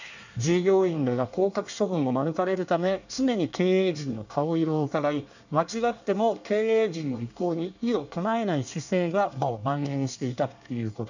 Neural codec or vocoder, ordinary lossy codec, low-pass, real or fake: codec, 24 kHz, 1 kbps, SNAC; none; 7.2 kHz; fake